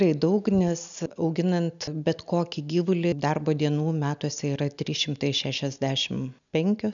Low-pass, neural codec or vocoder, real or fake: 7.2 kHz; none; real